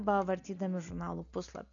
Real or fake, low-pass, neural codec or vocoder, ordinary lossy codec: real; 7.2 kHz; none; MP3, 96 kbps